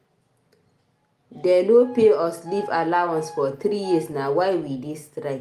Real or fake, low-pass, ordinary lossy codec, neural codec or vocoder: real; 14.4 kHz; Opus, 32 kbps; none